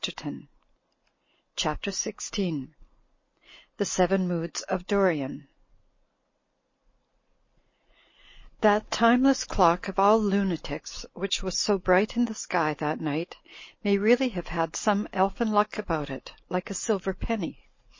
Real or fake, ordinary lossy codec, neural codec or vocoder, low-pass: real; MP3, 32 kbps; none; 7.2 kHz